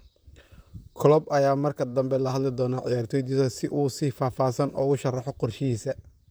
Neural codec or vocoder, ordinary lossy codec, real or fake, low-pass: vocoder, 44.1 kHz, 128 mel bands, Pupu-Vocoder; none; fake; none